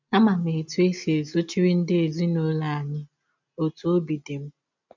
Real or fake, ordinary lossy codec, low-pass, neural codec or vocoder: real; none; 7.2 kHz; none